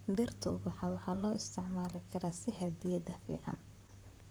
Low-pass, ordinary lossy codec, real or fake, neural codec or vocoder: none; none; fake; vocoder, 44.1 kHz, 128 mel bands, Pupu-Vocoder